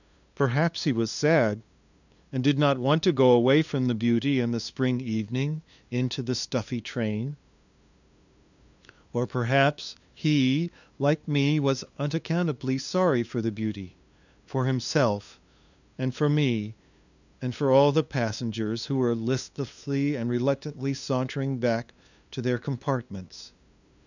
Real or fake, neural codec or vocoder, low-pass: fake; codec, 16 kHz, 2 kbps, FunCodec, trained on LibriTTS, 25 frames a second; 7.2 kHz